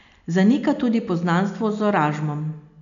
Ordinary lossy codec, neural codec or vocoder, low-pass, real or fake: none; none; 7.2 kHz; real